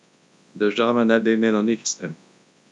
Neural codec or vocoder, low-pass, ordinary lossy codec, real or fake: codec, 24 kHz, 0.9 kbps, WavTokenizer, large speech release; 10.8 kHz; none; fake